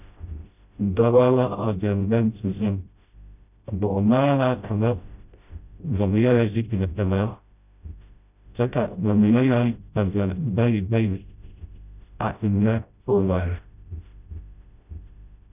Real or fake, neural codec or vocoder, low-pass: fake; codec, 16 kHz, 0.5 kbps, FreqCodec, smaller model; 3.6 kHz